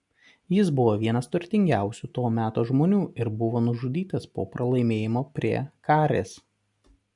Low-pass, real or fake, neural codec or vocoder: 10.8 kHz; real; none